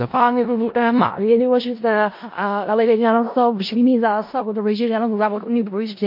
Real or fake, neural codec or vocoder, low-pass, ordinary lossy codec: fake; codec, 16 kHz in and 24 kHz out, 0.4 kbps, LongCat-Audio-Codec, four codebook decoder; 5.4 kHz; MP3, 32 kbps